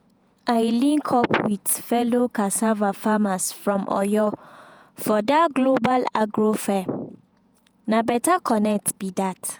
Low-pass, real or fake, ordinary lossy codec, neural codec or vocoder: none; fake; none; vocoder, 48 kHz, 128 mel bands, Vocos